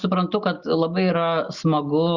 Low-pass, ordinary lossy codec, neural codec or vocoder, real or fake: 7.2 kHz; Opus, 64 kbps; none; real